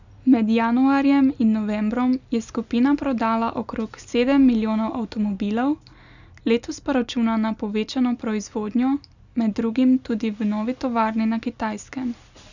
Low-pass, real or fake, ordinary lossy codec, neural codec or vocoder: 7.2 kHz; real; none; none